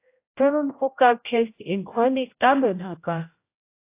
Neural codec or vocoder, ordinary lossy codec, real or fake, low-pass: codec, 16 kHz, 0.5 kbps, X-Codec, HuBERT features, trained on general audio; AAC, 24 kbps; fake; 3.6 kHz